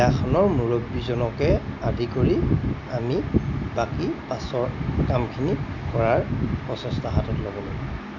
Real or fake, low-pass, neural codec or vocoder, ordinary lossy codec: real; 7.2 kHz; none; none